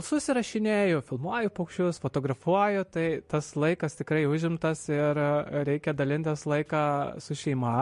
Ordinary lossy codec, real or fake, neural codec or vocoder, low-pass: MP3, 48 kbps; real; none; 14.4 kHz